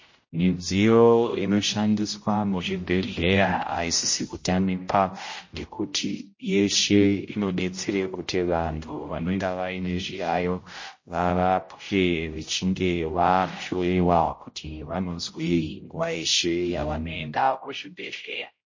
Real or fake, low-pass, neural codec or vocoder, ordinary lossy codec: fake; 7.2 kHz; codec, 16 kHz, 0.5 kbps, X-Codec, HuBERT features, trained on general audio; MP3, 32 kbps